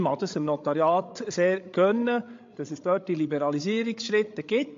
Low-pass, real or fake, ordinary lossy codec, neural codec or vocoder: 7.2 kHz; fake; AAC, 48 kbps; codec, 16 kHz, 16 kbps, FreqCodec, larger model